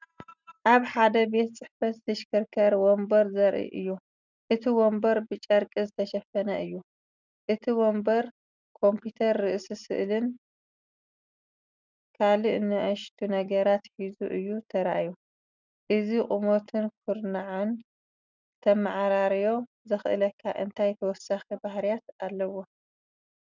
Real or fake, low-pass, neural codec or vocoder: real; 7.2 kHz; none